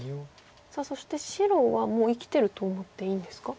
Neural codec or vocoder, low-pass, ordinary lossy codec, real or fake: none; none; none; real